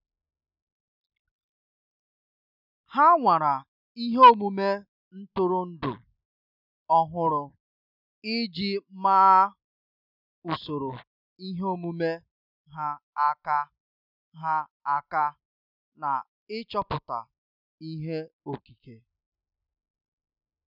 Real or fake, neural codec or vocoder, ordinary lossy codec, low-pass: real; none; none; 5.4 kHz